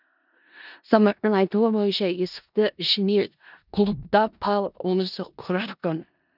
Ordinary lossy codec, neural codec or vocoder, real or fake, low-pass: none; codec, 16 kHz in and 24 kHz out, 0.4 kbps, LongCat-Audio-Codec, four codebook decoder; fake; 5.4 kHz